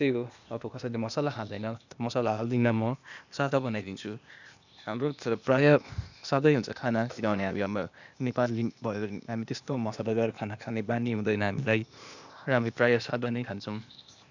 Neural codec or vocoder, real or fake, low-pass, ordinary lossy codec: codec, 16 kHz, 0.8 kbps, ZipCodec; fake; 7.2 kHz; none